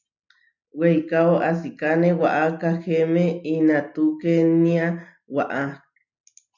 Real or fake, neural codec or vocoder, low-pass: real; none; 7.2 kHz